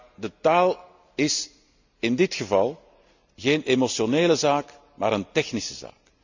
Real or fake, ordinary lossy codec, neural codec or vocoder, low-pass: real; none; none; 7.2 kHz